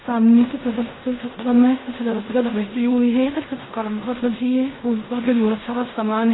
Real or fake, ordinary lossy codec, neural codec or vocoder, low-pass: fake; AAC, 16 kbps; codec, 16 kHz in and 24 kHz out, 0.4 kbps, LongCat-Audio-Codec, fine tuned four codebook decoder; 7.2 kHz